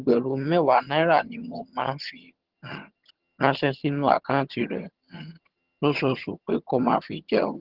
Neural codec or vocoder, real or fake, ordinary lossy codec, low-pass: vocoder, 22.05 kHz, 80 mel bands, HiFi-GAN; fake; Opus, 16 kbps; 5.4 kHz